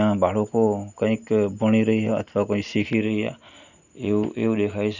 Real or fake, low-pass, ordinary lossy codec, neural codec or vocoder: real; 7.2 kHz; none; none